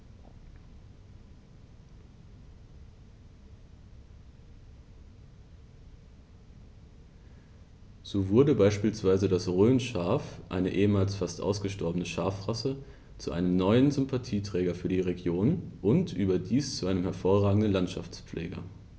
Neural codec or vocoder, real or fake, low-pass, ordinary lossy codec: none; real; none; none